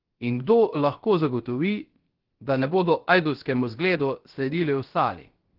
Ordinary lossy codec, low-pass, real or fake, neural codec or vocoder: Opus, 16 kbps; 5.4 kHz; fake; codec, 16 kHz, about 1 kbps, DyCAST, with the encoder's durations